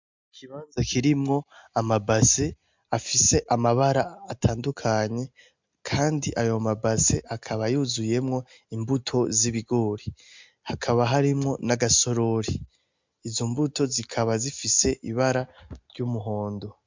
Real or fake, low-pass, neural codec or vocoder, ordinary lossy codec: real; 7.2 kHz; none; MP3, 64 kbps